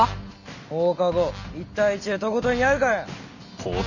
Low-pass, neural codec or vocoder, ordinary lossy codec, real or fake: 7.2 kHz; none; none; real